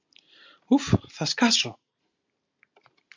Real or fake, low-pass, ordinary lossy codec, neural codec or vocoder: real; 7.2 kHz; MP3, 64 kbps; none